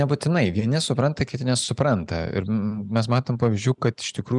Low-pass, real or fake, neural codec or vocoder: 10.8 kHz; real; none